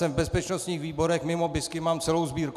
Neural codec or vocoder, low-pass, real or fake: none; 14.4 kHz; real